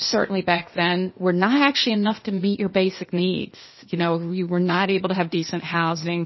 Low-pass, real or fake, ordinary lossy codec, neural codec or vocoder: 7.2 kHz; fake; MP3, 24 kbps; codec, 16 kHz, 0.8 kbps, ZipCodec